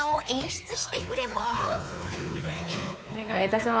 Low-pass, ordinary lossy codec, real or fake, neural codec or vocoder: none; none; fake; codec, 16 kHz, 4 kbps, X-Codec, WavLM features, trained on Multilingual LibriSpeech